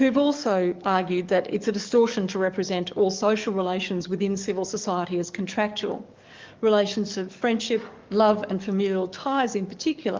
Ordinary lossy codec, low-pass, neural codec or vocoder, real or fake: Opus, 24 kbps; 7.2 kHz; codec, 44.1 kHz, 7.8 kbps, DAC; fake